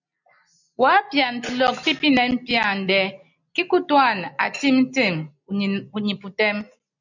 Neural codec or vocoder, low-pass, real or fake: none; 7.2 kHz; real